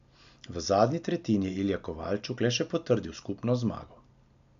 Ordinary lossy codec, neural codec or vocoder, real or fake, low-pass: AAC, 96 kbps; none; real; 7.2 kHz